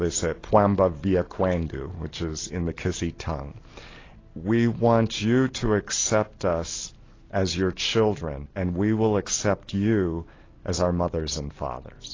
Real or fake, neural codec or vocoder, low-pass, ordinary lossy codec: real; none; 7.2 kHz; AAC, 32 kbps